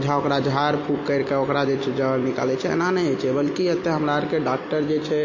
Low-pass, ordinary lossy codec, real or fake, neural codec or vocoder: 7.2 kHz; MP3, 32 kbps; real; none